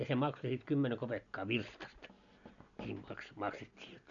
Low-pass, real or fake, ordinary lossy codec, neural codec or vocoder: 7.2 kHz; real; none; none